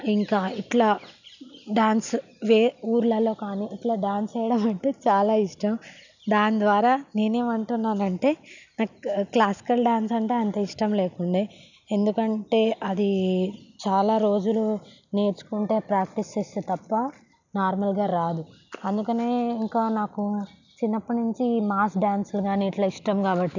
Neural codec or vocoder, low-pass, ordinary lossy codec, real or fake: none; 7.2 kHz; none; real